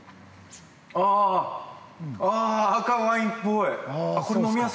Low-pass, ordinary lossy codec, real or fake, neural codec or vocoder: none; none; real; none